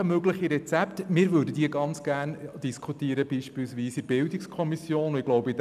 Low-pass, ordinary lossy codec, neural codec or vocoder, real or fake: 14.4 kHz; none; none; real